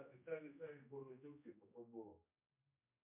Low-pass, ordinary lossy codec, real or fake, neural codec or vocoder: 3.6 kHz; MP3, 32 kbps; fake; codec, 16 kHz, 1 kbps, X-Codec, HuBERT features, trained on general audio